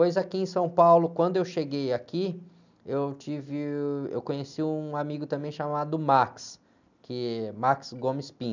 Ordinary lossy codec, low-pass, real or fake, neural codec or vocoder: none; 7.2 kHz; real; none